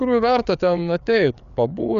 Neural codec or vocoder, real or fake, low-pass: codec, 16 kHz, 4 kbps, FreqCodec, larger model; fake; 7.2 kHz